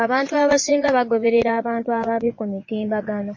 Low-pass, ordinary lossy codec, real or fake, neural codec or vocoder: 7.2 kHz; MP3, 32 kbps; fake; vocoder, 22.05 kHz, 80 mel bands, Vocos